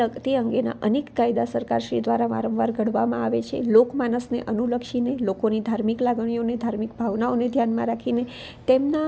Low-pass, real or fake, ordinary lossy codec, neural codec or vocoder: none; real; none; none